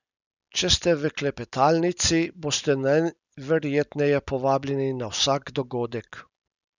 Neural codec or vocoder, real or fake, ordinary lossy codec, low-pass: none; real; none; 7.2 kHz